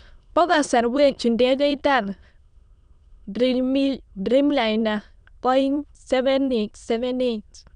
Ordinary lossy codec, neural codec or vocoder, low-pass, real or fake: none; autoencoder, 22.05 kHz, a latent of 192 numbers a frame, VITS, trained on many speakers; 9.9 kHz; fake